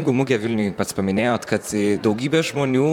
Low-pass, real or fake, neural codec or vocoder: 19.8 kHz; fake; vocoder, 44.1 kHz, 128 mel bands, Pupu-Vocoder